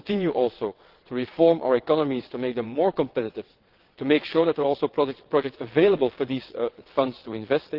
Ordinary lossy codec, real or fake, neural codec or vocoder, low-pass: Opus, 16 kbps; fake; vocoder, 22.05 kHz, 80 mel bands, WaveNeXt; 5.4 kHz